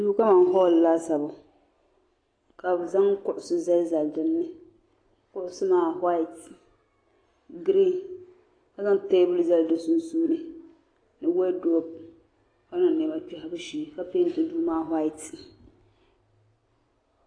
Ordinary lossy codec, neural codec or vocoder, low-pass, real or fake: AAC, 32 kbps; none; 9.9 kHz; real